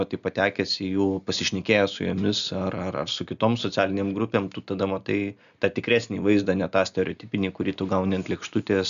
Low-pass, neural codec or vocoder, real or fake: 7.2 kHz; none; real